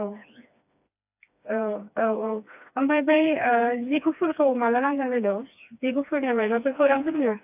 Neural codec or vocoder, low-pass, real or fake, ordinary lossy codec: codec, 16 kHz, 2 kbps, FreqCodec, smaller model; 3.6 kHz; fake; AAC, 32 kbps